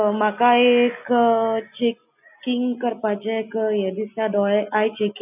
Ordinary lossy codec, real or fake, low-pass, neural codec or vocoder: none; real; 3.6 kHz; none